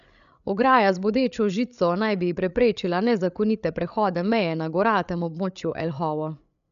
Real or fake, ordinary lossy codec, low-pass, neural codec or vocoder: fake; none; 7.2 kHz; codec, 16 kHz, 8 kbps, FreqCodec, larger model